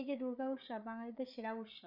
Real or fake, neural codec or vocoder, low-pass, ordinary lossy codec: fake; codec, 16 kHz, 8 kbps, FreqCodec, larger model; 5.4 kHz; none